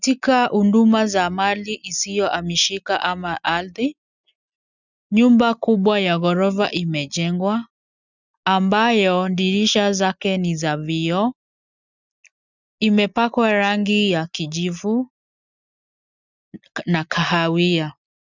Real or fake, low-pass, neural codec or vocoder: real; 7.2 kHz; none